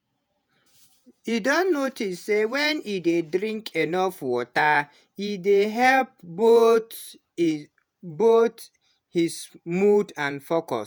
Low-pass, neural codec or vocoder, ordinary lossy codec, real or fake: none; vocoder, 48 kHz, 128 mel bands, Vocos; none; fake